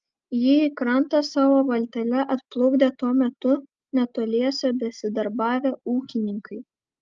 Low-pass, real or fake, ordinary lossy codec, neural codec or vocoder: 7.2 kHz; real; Opus, 24 kbps; none